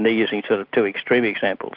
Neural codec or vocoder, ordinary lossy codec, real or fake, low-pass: none; Opus, 32 kbps; real; 5.4 kHz